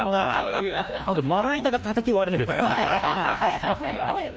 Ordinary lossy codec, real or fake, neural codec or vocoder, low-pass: none; fake; codec, 16 kHz, 1 kbps, FreqCodec, larger model; none